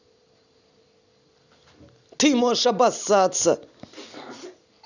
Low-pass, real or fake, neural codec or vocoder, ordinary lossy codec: 7.2 kHz; real; none; none